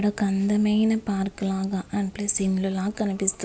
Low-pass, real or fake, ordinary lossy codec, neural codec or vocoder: none; real; none; none